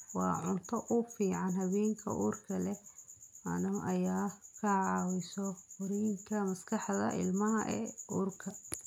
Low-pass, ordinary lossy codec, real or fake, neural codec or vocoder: 19.8 kHz; none; real; none